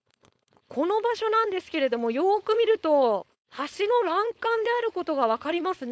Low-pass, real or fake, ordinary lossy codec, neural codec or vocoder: none; fake; none; codec, 16 kHz, 4.8 kbps, FACodec